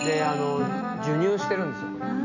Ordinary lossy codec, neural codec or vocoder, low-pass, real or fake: none; none; 7.2 kHz; real